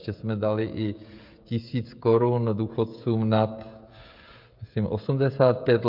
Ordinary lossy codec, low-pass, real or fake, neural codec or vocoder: MP3, 48 kbps; 5.4 kHz; fake; codec, 16 kHz, 16 kbps, FreqCodec, smaller model